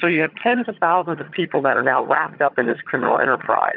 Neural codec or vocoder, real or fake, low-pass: vocoder, 22.05 kHz, 80 mel bands, HiFi-GAN; fake; 5.4 kHz